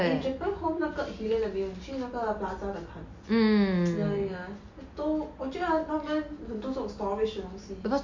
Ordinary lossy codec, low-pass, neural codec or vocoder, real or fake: MP3, 48 kbps; 7.2 kHz; none; real